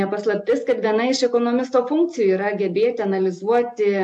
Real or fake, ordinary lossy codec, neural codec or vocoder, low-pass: real; AAC, 64 kbps; none; 10.8 kHz